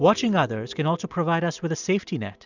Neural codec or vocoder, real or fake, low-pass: none; real; 7.2 kHz